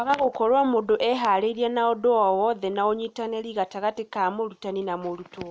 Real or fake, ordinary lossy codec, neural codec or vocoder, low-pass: real; none; none; none